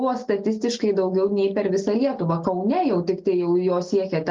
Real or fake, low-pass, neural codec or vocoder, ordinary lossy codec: real; 7.2 kHz; none; Opus, 32 kbps